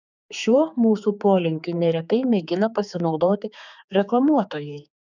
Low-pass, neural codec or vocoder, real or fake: 7.2 kHz; codec, 16 kHz, 4 kbps, X-Codec, HuBERT features, trained on general audio; fake